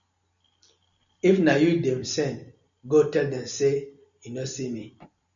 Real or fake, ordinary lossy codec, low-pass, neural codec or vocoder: real; MP3, 96 kbps; 7.2 kHz; none